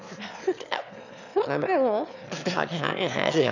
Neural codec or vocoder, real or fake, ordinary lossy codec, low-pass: autoencoder, 22.05 kHz, a latent of 192 numbers a frame, VITS, trained on one speaker; fake; none; 7.2 kHz